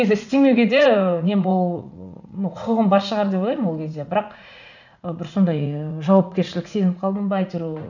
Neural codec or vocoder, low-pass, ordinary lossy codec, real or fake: vocoder, 44.1 kHz, 80 mel bands, Vocos; 7.2 kHz; none; fake